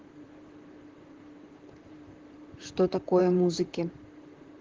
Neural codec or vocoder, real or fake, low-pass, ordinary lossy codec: vocoder, 44.1 kHz, 128 mel bands, Pupu-Vocoder; fake; 7.2 kHz; Opus, 16 kbps